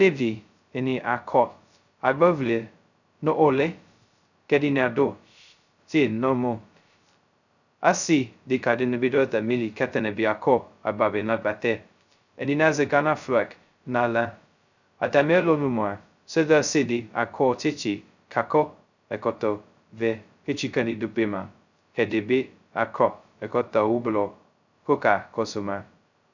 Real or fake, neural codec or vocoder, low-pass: fake; codec, 16 kHz, 0.2 kbps, FocalCodec; 7.2 kHz